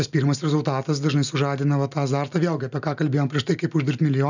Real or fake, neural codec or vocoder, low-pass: real; none; 7.2 kHz